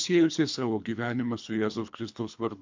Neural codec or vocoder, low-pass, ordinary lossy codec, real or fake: codec, 24 kHz, 3 kbps, HILCodec; 7.2 kHz; MP3, 64 kbps; fake